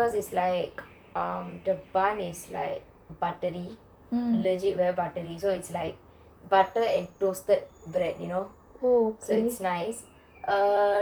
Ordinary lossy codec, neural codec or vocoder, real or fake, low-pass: none; vocoder, 44.1 kHz, 128 mel bands, Pupu-Vocoder; fake; none